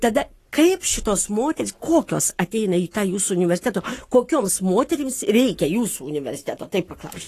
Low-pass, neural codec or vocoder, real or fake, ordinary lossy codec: 14.4 kHz; codec, 44.1 kHz, 7.8 kbps, Pupu-Codec; fake; AAC, 48 kbps